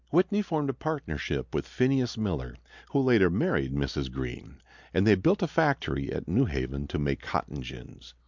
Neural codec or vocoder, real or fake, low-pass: none; real; 7.2 kHz